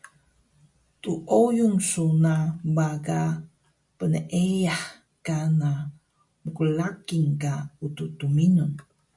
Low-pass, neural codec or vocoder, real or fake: 10.8 kHz; none; real